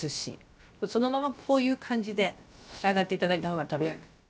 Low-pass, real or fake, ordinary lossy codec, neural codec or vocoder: none; fake; none; codec, 16 kHz, about 1 kbps, DyCAST, with the encoder's durations